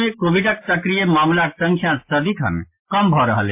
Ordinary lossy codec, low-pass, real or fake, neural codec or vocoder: MP3, 24 kbps; 3.6 kHz; real; none